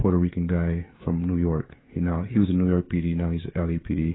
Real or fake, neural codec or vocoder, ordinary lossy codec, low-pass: fake; codec, 16 kHz, 16 kbps, FreqCodec, smaller model; AAC, 16 kbps; 7.2 kHz